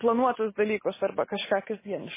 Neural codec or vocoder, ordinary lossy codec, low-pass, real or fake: none; MP3, 16 kbps; 3.6 kHz; real